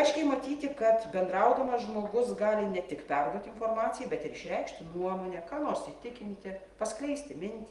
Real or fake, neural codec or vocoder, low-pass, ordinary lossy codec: real; none; 10.8 kHz; Opus, 24 kbps